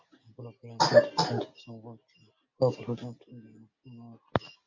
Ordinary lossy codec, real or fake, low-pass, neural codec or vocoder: MP3, 64 kbps; real; 7.2 kHz; none